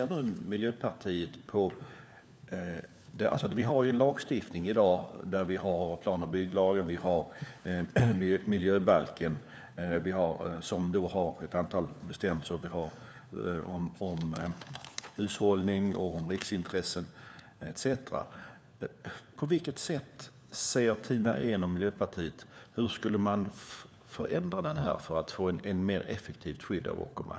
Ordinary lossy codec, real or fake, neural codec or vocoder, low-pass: none; fake; codec, 16 kHz, 4 kbps, FunCodec, trained on LibriTTS, 50 frames a second; none